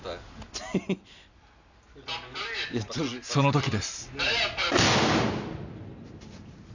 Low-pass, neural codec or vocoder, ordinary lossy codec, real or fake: 7.2 kHz; none; none; real